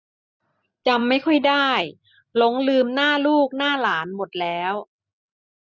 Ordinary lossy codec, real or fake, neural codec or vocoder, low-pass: none; real; none; none